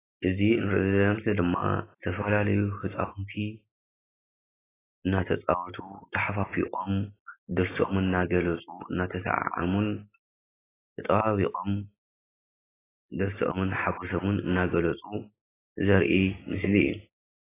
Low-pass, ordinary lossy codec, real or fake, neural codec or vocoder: 3.6 kHz; AAC, 16 kbps; real; none